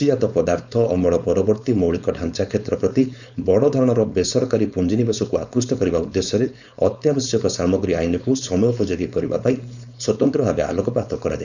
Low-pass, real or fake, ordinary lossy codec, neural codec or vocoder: 7.2 kHz; fake; none; codec, 16 kHz, 4.8 kbps, FACodec